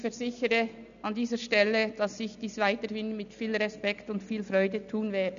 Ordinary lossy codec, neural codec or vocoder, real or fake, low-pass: AAC, 96 kbps; none; real; 7.2 kHz